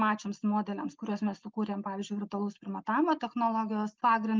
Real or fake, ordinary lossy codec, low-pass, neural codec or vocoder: real; Opus, 32 kbps; 7.2 kHz; none